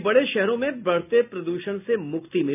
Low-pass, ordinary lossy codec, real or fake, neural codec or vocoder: 3.6 kHz; none; real; none